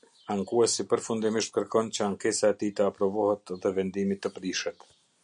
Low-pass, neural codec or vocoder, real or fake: 9.9 kHz; none; real